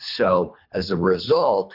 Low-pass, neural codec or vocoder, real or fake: 5.4 kHz; codec, 24 kHz, 3 kbps, HILCodec; fake